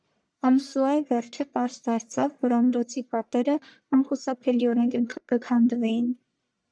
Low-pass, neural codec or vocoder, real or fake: 9.9 kHz; codec, 44.1 kHz, 1.7 kbps, Pupu-Codec; fake